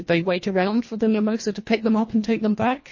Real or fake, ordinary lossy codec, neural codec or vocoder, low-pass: fake; MP3, 32 kbps; codec, 24 kHz, 1.5 kbps, HILCodec; 7.2 kHz